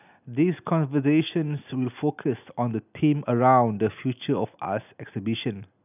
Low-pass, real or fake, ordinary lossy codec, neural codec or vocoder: 3.6 kHz; real; none; none